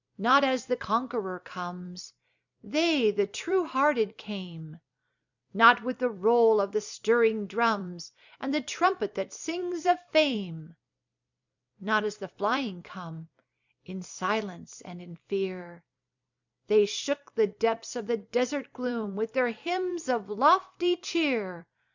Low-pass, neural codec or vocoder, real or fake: 7.2 kHz; vocoder, 44.1 kHz, 128 mel bands every 256 samples, BigVGAN v2; fake